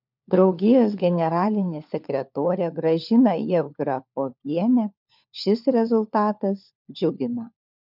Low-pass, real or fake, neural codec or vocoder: 5.4 kHz; fake; codec, 16 kHz, 4 kbps, FunCodec, trained on LibriTTS, 50 frames a second